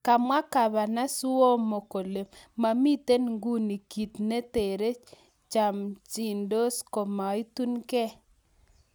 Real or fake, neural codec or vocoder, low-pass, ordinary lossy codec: real; none; none; none